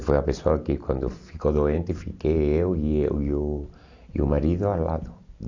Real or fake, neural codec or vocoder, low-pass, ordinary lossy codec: real; none; 7.2 kHz; AAC, 48 kbps